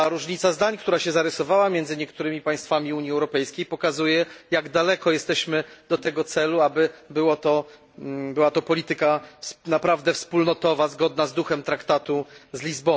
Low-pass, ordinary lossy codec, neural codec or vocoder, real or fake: none; none; none; real